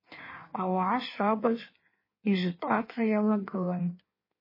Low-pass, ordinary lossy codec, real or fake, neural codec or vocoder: 5.4 kHz; MP3, 24 kbps; fake; codec, 16 kHz in and 24 kHz out, 1.1 kbps, FireRedTTS-2 codec